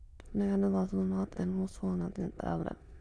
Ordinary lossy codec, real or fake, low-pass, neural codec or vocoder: none; fake; none; autoencoder, 22.05 kHz, a latent of 192 numbers a frame, VITS, trained on many speakers